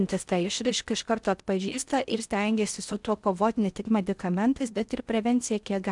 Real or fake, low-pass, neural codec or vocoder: fake; 10.8 kHz; codec, 16 kHz in and 24 kHz out, 0.6 kbps, FocalCodec, streaming, 4096 codes